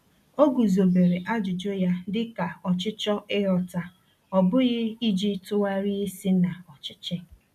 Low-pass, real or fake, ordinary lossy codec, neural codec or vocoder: 14.4 kHz; real; none; none